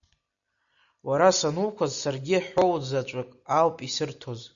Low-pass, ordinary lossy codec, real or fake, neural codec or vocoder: 7.2 kHz; MP3, 96 kbps; real; none